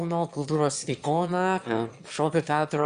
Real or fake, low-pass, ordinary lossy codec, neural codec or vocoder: fake; 9.9 kHz; Opus, 64 kbps; autoencoder, 22.05 kHz, a latent of 192 numbers a frame, VITS, trained on one speaker